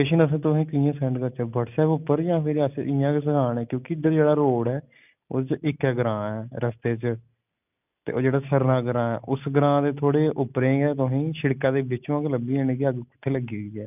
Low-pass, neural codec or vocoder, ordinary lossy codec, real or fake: 3.6 kHz; none; none; real